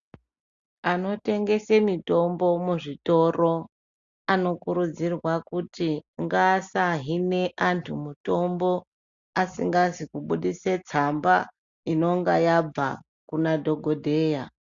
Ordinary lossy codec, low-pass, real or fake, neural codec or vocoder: Opus, 64 kbps; 7.2 kHz; real; none